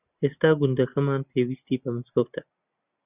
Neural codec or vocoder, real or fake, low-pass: none; real; 3.6 kHz